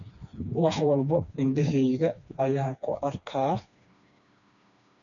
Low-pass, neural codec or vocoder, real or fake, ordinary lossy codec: 7.2 kHz; codec, 16 kHz, 2 kbps, FreqCodec, smaller model; fake; none